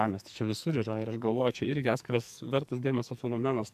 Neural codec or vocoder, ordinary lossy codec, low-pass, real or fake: codec, 44.1 kHz, 2.6 kbps, SNAC; AAC, 96 kbps; 14.4 kHz; fake